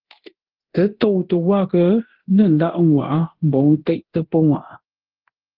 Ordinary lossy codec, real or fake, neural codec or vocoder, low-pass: Opus, 16 kbps; fake; codec, 24 kHz, 0.9 kbps, DualCodec; 5.4 kHz